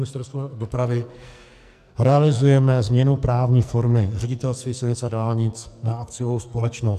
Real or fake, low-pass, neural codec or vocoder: fake; 14.4 kHz; codec, 44.1 kHz, 2.6 kbps, SNAC